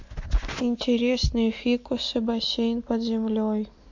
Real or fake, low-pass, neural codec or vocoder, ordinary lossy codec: real; 7.2 kHz; none; MP3, 48 kbps